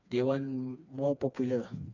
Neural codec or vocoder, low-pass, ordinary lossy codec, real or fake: codec, 16 kHz, 2 kbps, FreqCodec, smaller model; 7.2 kHz; none; fake